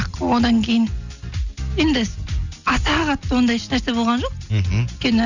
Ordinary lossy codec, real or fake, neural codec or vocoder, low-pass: none; real; none; 7.2 kHz